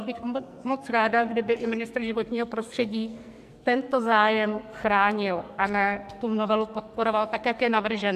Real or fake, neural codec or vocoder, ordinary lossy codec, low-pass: fake; codec, 44.1 kHz, 2.6 kbps, SNAC; MP3, 96 kbps; 14.4 kHz